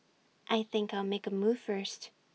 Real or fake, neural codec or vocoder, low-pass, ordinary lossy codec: real; none; none; none